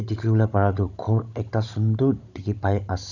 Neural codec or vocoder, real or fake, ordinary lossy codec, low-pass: codec, 16 kHz, 16 kbps, FunCodec, trained on Chinese and English, 50 frames a second; fake; none; 7.2 kHz